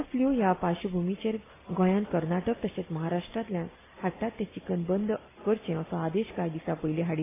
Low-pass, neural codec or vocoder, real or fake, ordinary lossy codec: 3.6 kHz; none; real; AAC, 16 kbps